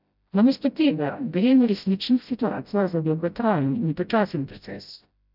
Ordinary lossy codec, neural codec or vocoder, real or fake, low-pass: none; codec, 16 kHz, 0.5 kbps, FreqCodec, smaller model; fake; 5.4 kHz